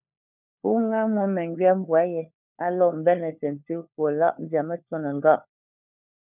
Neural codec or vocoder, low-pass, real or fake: codec, 16 kHz, 4 kbps, FunCodec, trained on LibriTTS, 50 frames a second; 3.6 kHz; fake